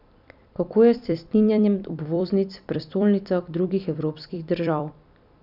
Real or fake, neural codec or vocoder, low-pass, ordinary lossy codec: fake; vocoder, 44.1 kHz, 128 mel bands every 256 samples, BigVGAN v2; 5.4 kHz; none